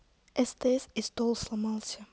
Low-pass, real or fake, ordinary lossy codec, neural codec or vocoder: none; real; none; none